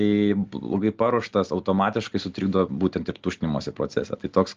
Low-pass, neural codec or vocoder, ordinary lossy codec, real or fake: 7.2 kHz; none; Opus, 32 kbps; real